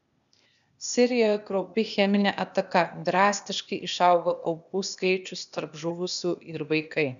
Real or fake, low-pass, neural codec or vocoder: fake; 7.2 kHz; codec, 16 kHz, 0.8 kbps, ZipCodec